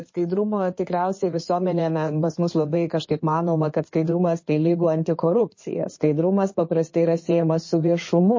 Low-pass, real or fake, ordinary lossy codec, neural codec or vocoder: 7.2 kHz; fake; MP3, 32 kbps; codec, 16 kHz in and 24 kHz out, 2.2 kbps, FireRedTTS-2 codec